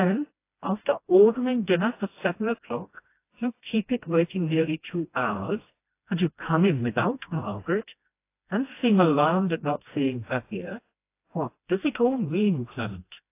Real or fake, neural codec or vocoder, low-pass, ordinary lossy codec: fake; codec, 16 kHz, 1 kbps, FreqCodec, smaller model; 3.6 kHz; AAC, 24 kbps